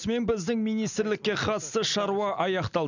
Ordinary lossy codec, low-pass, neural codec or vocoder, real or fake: none; 7.2 kHz; none; real